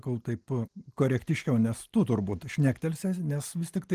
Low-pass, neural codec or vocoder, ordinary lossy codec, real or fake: 14.4 kHz; none; Opus, 24 kbps; real